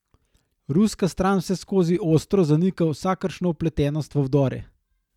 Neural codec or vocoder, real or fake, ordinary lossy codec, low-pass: none; real; none; 19.8 kHz